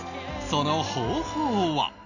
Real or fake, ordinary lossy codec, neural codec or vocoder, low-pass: real; none; none; 7.2 kHz